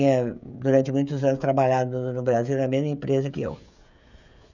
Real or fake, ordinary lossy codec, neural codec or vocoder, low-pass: fake; none; codec, 16 kHz, 16 kbps, FreqCodec, smaller model; 7.2 kHz